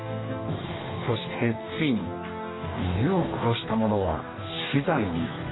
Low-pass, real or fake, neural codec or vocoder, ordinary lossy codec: 7.2 kHz; fake; codec, 44.1 kHz, 2.6 kbps, DAC; AAC, 16 kbps